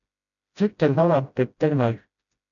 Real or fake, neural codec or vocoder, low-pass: fake; codec, 16 kHz, 0.5 kbps, FreqCodec, smaller model; 7.2 kHz